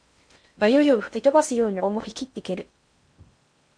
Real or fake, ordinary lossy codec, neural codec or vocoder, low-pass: fake; MP3, 64 kbps; codec, 16 kHz in and 24 kHz out, 0.6 kbps, FocalCodec, streaming, 2048 codes; 9.9 kHz